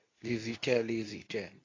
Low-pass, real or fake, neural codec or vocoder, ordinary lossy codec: 7.2 kHz; fake; codec, 24 kHz, 0.9 kbps, WavTokenizer, medium speech release version 2; AAC, 32 kbps